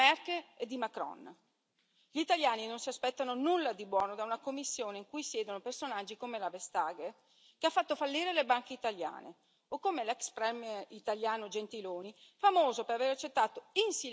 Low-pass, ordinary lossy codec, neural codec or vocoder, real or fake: none; none; none; real